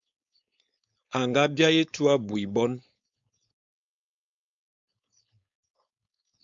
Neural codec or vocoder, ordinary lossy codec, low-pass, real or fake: codec, 16 kHz, 4.8 kbps, FACodec; MP3, 64 kbps; 7.2 kHz; fake